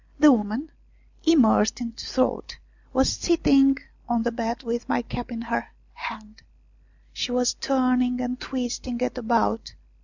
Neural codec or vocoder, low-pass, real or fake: none; 7.2 kHz; real